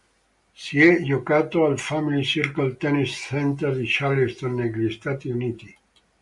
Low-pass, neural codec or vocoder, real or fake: 10.8 kHz; none; real